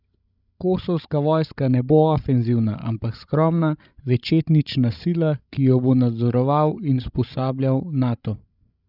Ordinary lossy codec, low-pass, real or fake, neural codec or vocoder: none; 5.4 kHz; fake; codec, 16 kHz, 16 kbps, FreqCodec, larger model